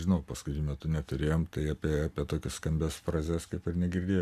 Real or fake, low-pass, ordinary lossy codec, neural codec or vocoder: real; 14.4 kHz; MP3, 96 kbps; none